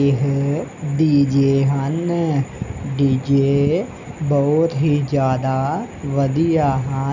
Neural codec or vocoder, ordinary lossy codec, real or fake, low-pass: none; none; real; 7.2 kHz